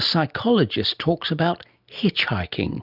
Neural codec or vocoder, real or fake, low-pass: none; real; 5.4 kHz